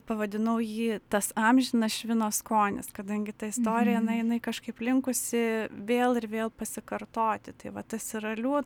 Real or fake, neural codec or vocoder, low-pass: real; none; 19.8 kHz